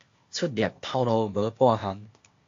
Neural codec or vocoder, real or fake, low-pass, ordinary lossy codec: codec, 16 kHz, 0.8 kbps, ZipCodec; fake; 7.2 kHz; AAC, 48 kbps